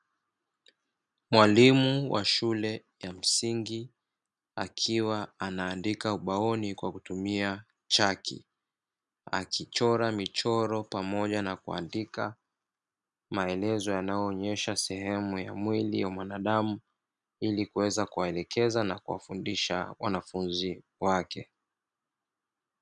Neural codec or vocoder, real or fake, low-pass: none; real; 10.8 kHz